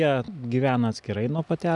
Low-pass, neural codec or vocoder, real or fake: 10.8 kHz; none; real